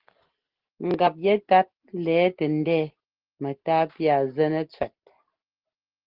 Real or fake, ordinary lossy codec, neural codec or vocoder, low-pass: real; Opus, 16 kbps; none; 5.4 kHz